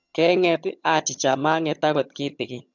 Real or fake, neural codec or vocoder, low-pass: fake; vocoder, 22.05 kHz, 80 mel bands, HiFi-GAN; 7.2 kHz